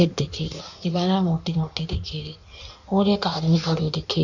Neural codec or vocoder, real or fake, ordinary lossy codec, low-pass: codec, 16 kHz in and 24 kHz out, 1.1 kbps, FireRedTTS-2 codec; fake; none; 7.2 kHz